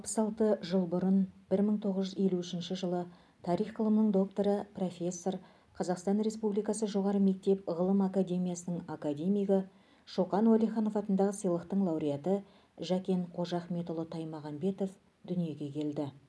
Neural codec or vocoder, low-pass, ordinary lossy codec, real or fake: none; none; none; real